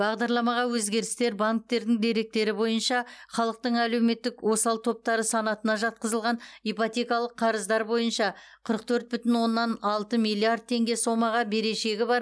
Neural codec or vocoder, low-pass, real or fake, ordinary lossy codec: none; none; real; none